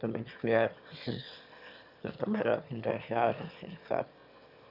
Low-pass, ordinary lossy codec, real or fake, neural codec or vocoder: 5.4 kHz; none; fake; autoencoder, 22.05 kHz, a latent of 192 numbers a frame, VITS, trained on one speaker